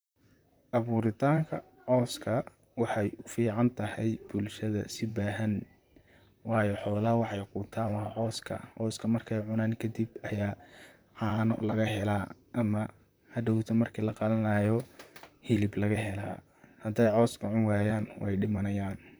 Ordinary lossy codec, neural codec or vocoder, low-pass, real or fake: none; vocoder, 44.1 kHz, 128 mel bands, Pupu-Vocoder; none; fake